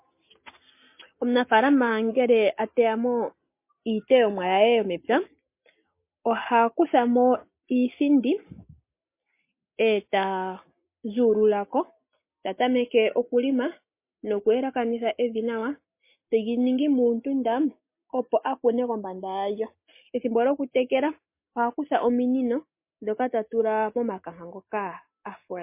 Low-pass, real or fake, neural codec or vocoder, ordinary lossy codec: 3.6 kHz; real; none; MP3, 24 kbps